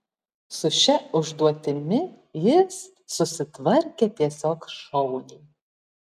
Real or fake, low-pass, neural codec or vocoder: real; 14.4 kHz; none